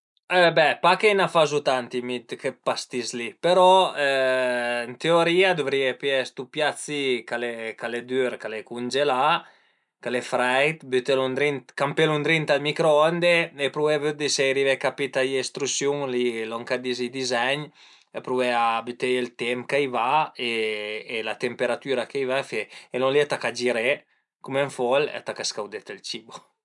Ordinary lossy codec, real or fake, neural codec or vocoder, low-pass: none; real; none; 10.8 kHz